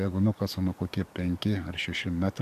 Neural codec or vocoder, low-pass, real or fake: codec, 44.1 kHz, 7.8 kbps, DAC; 14.4 kHz; fake